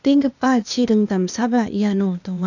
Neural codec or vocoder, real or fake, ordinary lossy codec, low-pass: codec, 16 kHz, 0.8 kbps, ZipCodec; fake; none; 7.2 kHz